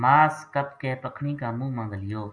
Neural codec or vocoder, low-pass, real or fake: none; 9.9 kHz; real